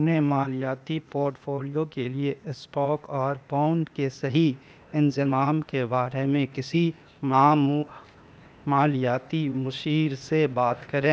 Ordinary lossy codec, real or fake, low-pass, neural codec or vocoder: none; fake; none; codec, 16 kHz, 0.8 kbps, ZipCodec